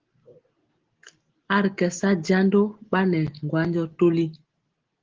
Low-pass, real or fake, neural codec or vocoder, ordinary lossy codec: 7.2 kHz; real; none; Opus, 16 kbps